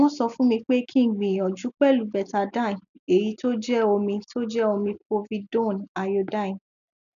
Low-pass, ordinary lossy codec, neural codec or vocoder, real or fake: 7.2 kHz; none; none; real